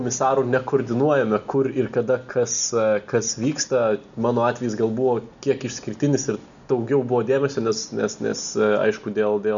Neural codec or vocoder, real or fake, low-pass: none; real; 7.2 kHz